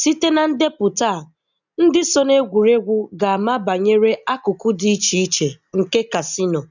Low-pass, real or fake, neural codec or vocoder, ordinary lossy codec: 7.2 kHz; real; none; none